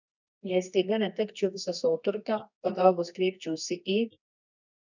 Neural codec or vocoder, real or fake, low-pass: codec, 24 kHz, 0.9 kbps, WavTokenizer, medium music audio release; fake; 7.2 kHz